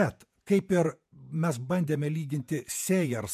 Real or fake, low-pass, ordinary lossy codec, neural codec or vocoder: fake; 14.4 kHz; MP3, 96 kbps; vocoder, 48 kHz, 128 mel bands, Vocos